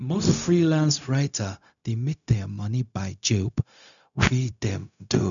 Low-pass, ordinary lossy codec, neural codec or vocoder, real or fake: 7.2 kHz; none; codec, 16 kHz, 0.4 kbps, LongCat-Audio-Codec; fake